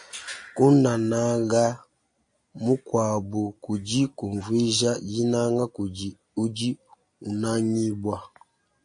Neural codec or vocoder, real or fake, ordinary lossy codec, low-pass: none; real; AAC, 64 kbps; 9.9 kHz